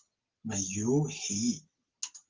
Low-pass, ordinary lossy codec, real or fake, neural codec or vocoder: 7.2 kHz; Opus, 16 kbps; real; none